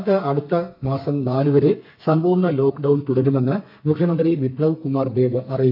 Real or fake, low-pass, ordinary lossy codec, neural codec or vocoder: fake; 5.4 kHz; AAC, 48 kbps; codec, 32 kHz, 1.9 kbps, SNAC